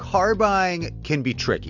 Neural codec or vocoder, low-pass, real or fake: none; 7.2 kHz; real